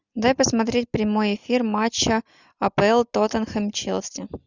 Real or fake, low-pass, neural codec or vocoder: real; 7.2 kHz; none